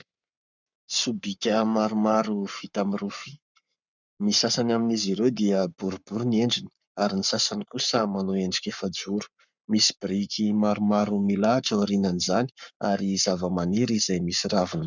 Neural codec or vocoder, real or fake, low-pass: codec, 44.1 kHz, 7.8 kbps, Pupu-Codec; fake; 7.2 kHz